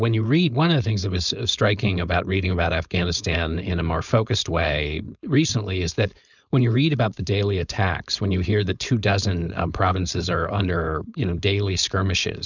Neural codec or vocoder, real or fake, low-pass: codec, 16 kHz, 4.8 kbps, FACodec; fake; 7.2 kHz